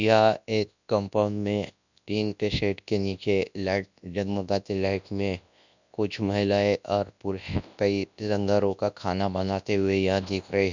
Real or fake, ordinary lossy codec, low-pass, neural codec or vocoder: fake; none; 7.2 kHz; codec, 24 kHz, 0.9 kbps, WavTokenizer, large speech release